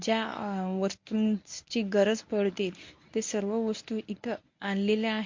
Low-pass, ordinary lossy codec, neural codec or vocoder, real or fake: 7.2 kHz; MP3, 48 kbps; codec, 24 kHz, 0.9 kbps, WavTokenizer, medium speech release version 1; fake